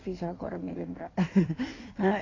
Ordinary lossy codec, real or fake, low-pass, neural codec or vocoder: AAC, 32 kbps; fake; 7.2 kHz; codec, 16 kHz in and 24 kHz out, 1.1 kbps, FireRedTTS-2 codec